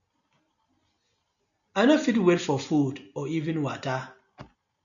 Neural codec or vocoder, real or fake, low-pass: none; real; 7.2 kHz